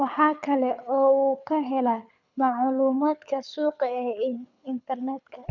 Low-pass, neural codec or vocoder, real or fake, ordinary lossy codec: 7.2 kHz; codec, 24 kHz, 6 kbps, HILCodec; fake; none